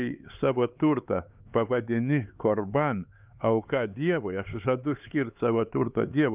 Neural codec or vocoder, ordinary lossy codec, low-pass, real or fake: codec, 16 kHz, 4 kbps, X-Codec, HuBERT features, trained on LibriSpeech; Opus, 24 kbps; 3.6 kHz; fake